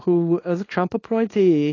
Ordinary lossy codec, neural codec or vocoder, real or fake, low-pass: AAC, 32 kbps; codec, 24 kHz, 0.9 kbps, WavTokenizer, small release; fake; 7.2 kHz